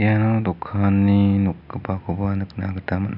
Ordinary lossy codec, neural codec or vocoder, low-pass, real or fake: none; none; 5.4 kHz; real